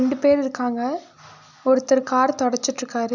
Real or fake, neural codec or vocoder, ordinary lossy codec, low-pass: real; none; none; 7.2 kHz